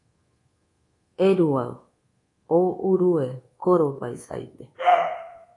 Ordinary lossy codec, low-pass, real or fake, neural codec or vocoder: AAC, 32 kbps; 10.8 kHz; fake; codec, 24 kHz, 1.2 kbps, DualCodec